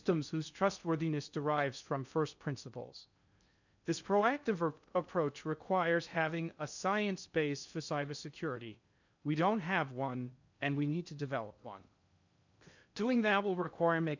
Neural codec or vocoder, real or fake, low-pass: codec, 16 kHz in and 24 kHz out, 0.6 kbps, FocalCodec, streaming, 4096 codes; fake; 7.2 kHz